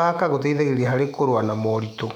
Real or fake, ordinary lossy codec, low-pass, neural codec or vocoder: fake; none; 19.8 kHz; autoencoder, 48 kHz, 128 numbers a frame, DAC-VAE, trained on Japanese speech